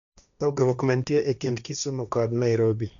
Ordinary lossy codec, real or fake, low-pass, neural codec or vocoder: none; fake; 7.2 kHz; codec, 16 kHz, 1.1 kbps, Voila-Tokenizer